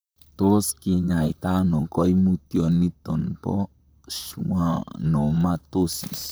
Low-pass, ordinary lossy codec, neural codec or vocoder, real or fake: none; none; vocoder, 44.1 kHz, 128 mel bands, Pupu-Vocoder; fake